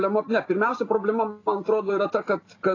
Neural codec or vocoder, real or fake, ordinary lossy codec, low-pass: none; real; AAC, 32 kbps; 7.2 kHz